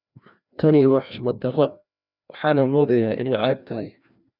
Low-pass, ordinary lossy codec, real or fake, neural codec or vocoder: 5.4 kHz; none; fake; codec, 16 kHz, 1 kbps, FreqCodec, larger model